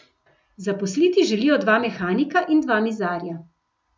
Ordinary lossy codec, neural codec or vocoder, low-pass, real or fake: none; none; none; real